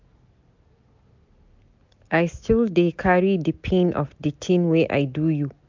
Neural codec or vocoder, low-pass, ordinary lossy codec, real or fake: none; 7.2 kHz; MP3, 64 kbps; real